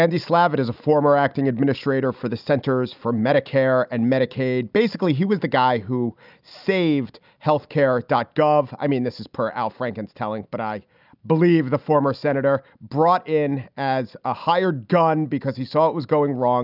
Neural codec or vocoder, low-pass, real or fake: none; 5.4 kHz; real